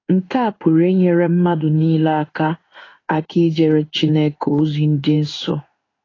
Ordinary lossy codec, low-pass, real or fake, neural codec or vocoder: AAC, 32 kbps; 7.2 kHz; fake; codec, 16 kHz in and 24 kHz out, 1 kbps, XY-Tokenizer